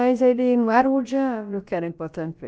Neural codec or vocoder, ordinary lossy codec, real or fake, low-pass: codec, 16 kHz, about 1 kbps, DyCAST, with the encoder's durations; none; fake; none